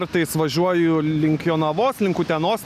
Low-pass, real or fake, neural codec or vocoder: 14.4 kHz; real; none